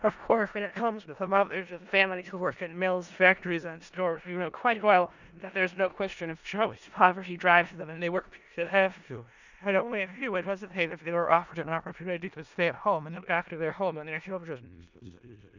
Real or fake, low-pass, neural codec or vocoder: fake; 7.2 kHz; codec, 16 kHz in and 24 kHz out, 0.4 kbps, LongCat-Audio-Codec, four codebook decoder